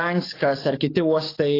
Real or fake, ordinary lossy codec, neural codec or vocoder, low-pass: fake; AAC, 24 kbps; codec, 44.1 kHz, 7.8 kbps, DAC; 5.4 kHz